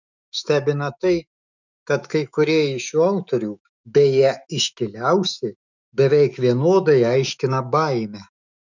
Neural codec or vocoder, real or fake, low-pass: autoencoder, 48 kHz, 128 numbers a frame, DAC-VAE, trained on Japanese speech; fake; 7.2 kHz